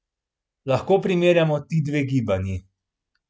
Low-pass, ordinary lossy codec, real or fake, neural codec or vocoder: none; none; real; none